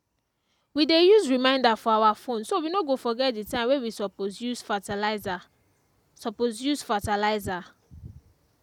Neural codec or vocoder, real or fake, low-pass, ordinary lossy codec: none; real; 19.8 kHz; none